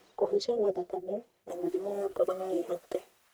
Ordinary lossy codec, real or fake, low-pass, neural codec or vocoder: none; fake; none; codec, 44.1 kHz, 1.7 kbps, Pupu-Codec